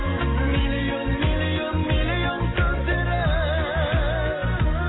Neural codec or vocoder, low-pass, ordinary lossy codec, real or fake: none; 7.2 kHz; AAC, 16 kbps; real